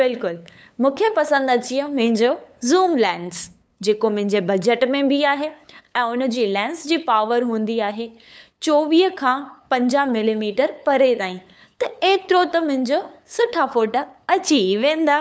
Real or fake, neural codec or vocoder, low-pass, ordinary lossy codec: fake; codec, 16 kHz, 8 kbps, FunCodec, trained on LibriTTS, 25 frames a second; none; none